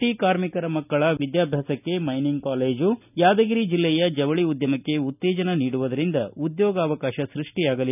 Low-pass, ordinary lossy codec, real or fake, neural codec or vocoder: 3.6 kHz; none; real; none